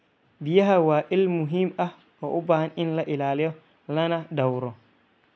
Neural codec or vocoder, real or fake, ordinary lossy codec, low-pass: none; real; none; none